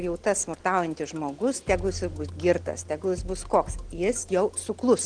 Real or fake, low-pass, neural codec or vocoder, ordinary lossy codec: real; 9.9 kHz; none; Opus, 16 kbps